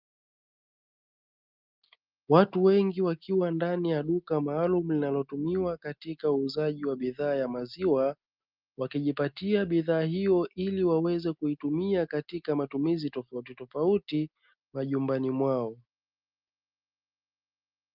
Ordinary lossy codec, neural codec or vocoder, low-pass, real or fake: Opus, 24 kbps; none; 5.4 kHz; real